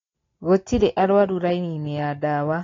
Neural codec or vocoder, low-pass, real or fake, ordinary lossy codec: none; 7.2 kHz; real; AAC, 32 kbps